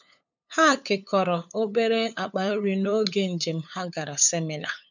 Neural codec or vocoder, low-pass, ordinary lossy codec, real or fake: codec, 16 kHz, 8 kbps, FunCodec, trained on LibriTTS, 25 frames a second; 7.2 kHz; none; fake